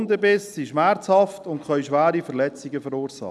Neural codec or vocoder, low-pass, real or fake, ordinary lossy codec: none; none; real; none